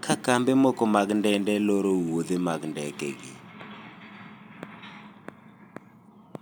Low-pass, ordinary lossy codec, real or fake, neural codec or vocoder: none; none; real; none